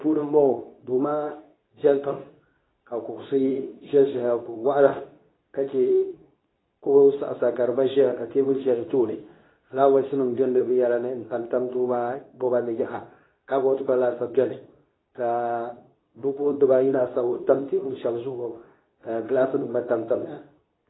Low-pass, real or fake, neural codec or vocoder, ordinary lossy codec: 7.2 kHz; fake; codec, 24 kHz, 0.9 kbps, WavTokenizer, medium speech release version 2; AAC, 16 kbps